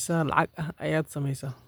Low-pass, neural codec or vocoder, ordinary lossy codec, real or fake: none; none; none; real